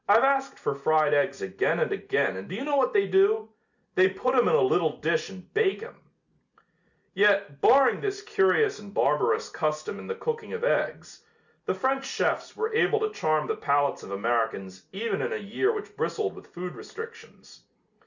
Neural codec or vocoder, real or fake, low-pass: none; real; 7.2 kHz